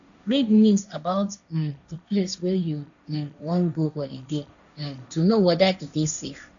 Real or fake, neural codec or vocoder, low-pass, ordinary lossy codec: fake; codec, 16 kHz, 1.1 kbps, Voila-Tokenizer; 7.2 kHz; none